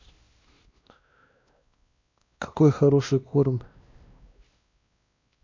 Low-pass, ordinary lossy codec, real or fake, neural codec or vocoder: 7.2 kHz; MP3, 64 kbps; fake; codec, 16 kHz, 1 kbps, X-Codec, WavLM features, trained on Multilingual LibriSpeech